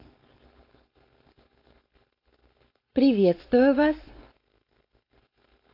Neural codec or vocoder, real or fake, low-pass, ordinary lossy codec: codec, 16 kHz, 4.8 kbps, FACodec; fake; 5.4 kHz; AAC, 32 kbps